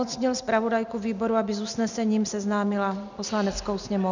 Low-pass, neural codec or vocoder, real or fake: 7.2 kHz; none; real